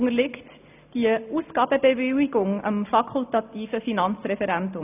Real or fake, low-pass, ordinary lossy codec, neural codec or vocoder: real; 3.6 kHz; none; none